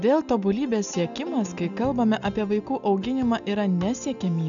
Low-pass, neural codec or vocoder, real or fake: 7.2 kHz; none; real